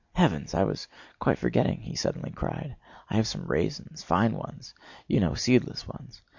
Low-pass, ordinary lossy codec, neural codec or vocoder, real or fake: 7.2 kHz; MP3, 48 kbps; none; real